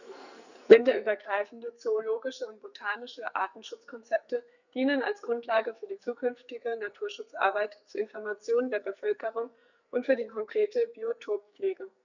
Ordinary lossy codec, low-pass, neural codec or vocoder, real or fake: none; 7.2 kHz; codec, 44.1 kHz, 2.6 kbps, SNAC; fake